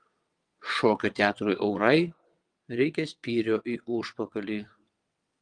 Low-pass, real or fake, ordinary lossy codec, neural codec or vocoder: 9.9 kHz; fake; Opus, 32 kbps; vocoder, 22.05 kHz, 80 mel bands, WaveNeXt